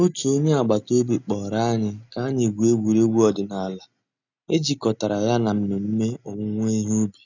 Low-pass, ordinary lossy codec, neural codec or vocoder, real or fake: 7.2 kHz; none; none; real